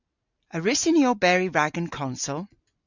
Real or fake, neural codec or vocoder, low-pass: real; none; 7.2 kHz